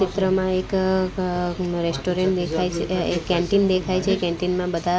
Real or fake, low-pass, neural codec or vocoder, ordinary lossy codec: real; none; none; none